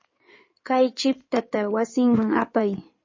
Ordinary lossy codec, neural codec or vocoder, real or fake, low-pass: MP3, 32 kbps; codec, 16 kHz in and 24 kHz out, 2.2 kbps, FireRedTTS-2 codec; fake; 7.2 kHz